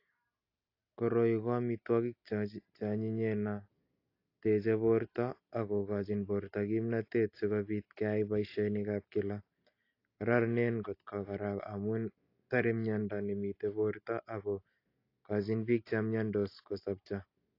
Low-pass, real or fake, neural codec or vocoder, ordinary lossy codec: 5.4 kHz; real; none; AAC, 32 kbps